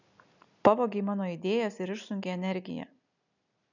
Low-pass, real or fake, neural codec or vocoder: 7.2 kHz; real; none